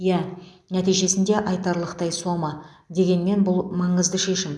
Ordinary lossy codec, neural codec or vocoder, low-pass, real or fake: none; none; none; real